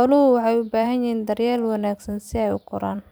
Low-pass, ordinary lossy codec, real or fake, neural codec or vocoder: none; none; fake; vocoder, 44.1 kHz, 128 mel bands every 256 samples, BigVGAN v2